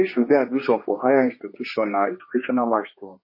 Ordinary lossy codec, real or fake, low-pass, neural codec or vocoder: MP3, 24 kbps; fake; 5.4 kHz; codec, 16 kHz, 2 kbps, X-Codec, HuBERT features, trained on general audio